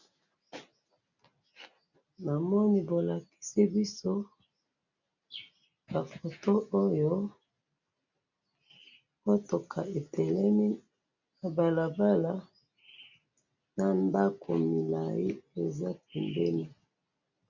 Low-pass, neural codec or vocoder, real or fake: 7.2 kHz; none; real